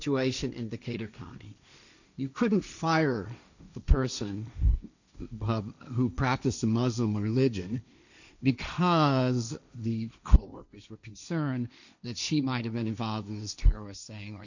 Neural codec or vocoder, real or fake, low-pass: codec, 16 kHz, 1.1 kbps, Voila-Tokenizer; fake; 7.2 kHz